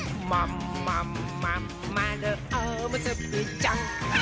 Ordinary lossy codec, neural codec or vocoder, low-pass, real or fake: none; none; none; real